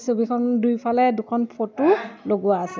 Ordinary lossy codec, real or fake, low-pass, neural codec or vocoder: none; real; none; none